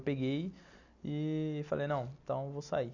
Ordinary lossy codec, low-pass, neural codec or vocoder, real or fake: none; 7.2 kHz; none; real